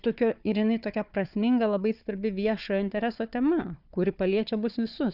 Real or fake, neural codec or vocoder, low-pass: fake; codec, 16 kHz, 4 kbps, FunCodec, trained on Chinese and English, 50 frames a second; 5.4 kHz